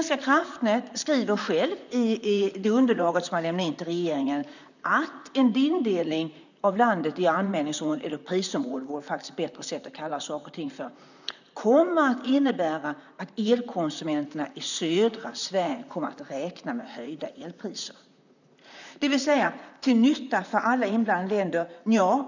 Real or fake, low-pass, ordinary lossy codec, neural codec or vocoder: fake; 7.2 kHz; none; vocoder, 44.1 kHz, 128 mel bands, Pupu-Vocoder